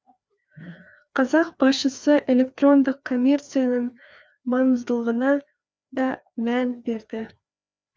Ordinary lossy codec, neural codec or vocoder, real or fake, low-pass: none; codec, 16 kHz, 2 kbps, FreqCodec, larger model; fake; none